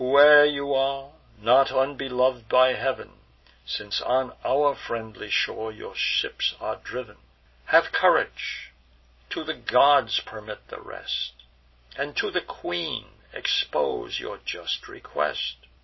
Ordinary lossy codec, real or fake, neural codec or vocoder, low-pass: MP3, 24 kbps; real; none; 7.2 kHz